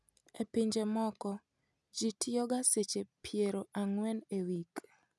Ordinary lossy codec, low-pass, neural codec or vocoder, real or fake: none; none; none; real